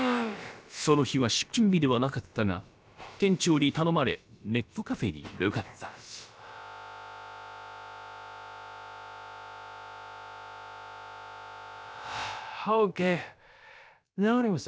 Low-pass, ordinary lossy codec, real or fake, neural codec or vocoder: none; none; fake; codec, 16 kHz, about 1 kbps, DyCAST, with the encoder's durations